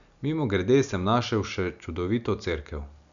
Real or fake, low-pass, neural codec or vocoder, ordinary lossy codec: real; 7.2 kHz; none; none